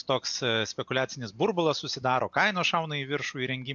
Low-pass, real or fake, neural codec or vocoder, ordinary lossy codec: 7.2 kHz; real; none; AAC, 64 kbps